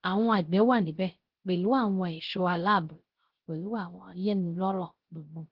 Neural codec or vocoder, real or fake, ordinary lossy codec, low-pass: codec, 16 kHz, 0.3 kbps, FocalCodec; fake; Opus, 16 kbps; 5.4 kHz